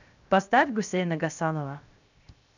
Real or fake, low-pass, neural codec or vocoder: fake; 7.2 kHz; codec, 16 kHz, 0.7 kbps, FocalCodec